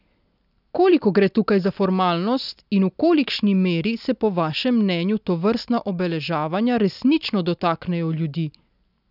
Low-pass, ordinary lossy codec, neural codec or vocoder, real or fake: 5.4 kHz; none; none; real